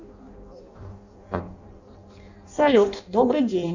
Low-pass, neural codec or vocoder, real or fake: 7.2 kHz; codec, 16 kHz in and 24 kHz out, 0.6 kbps, FireRedTTS-2 codec; fake